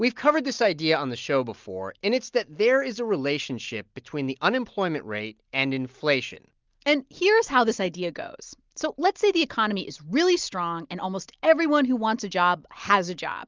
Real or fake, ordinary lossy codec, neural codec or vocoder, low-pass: real; Opus, 32 kbps; none; 7.2 kHz